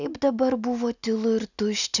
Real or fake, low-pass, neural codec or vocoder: real; 7.2 kHz; none